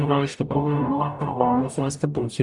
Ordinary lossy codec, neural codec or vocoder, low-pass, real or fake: Opus, 64 kbps; codec, 44.1 kHz, 0.9 kbps, DAC; 10.8 kHz; fake